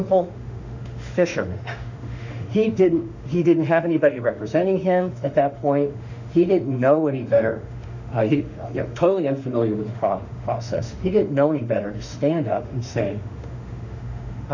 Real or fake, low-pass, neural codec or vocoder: fake; 7.2 kHz; autoencoder, 48 kHz, 32 numbers a frame, DAC-VAE, trained on Japanese speech